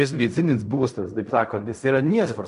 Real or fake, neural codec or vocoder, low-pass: fake; codec, 16 kHz in and 24 kHz out, 0.4 kbps, LongCat-Audio-Codec, fine tuned four codebook decoder; 10.8 kHz